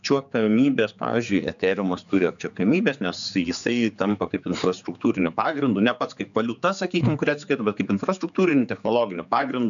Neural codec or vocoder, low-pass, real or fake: codec, 16 kHz, 4 kbps, X-Codec, HuBERT features, trained on general audio; 7.2 kHz; fake